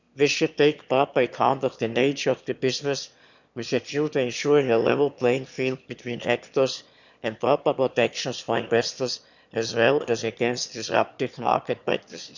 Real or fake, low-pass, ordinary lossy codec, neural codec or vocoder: fake; 7.2 kHz; none; autoencoder, 22.05 kHz, a latent of 192 numbers a frame, VITS, trained on one speaker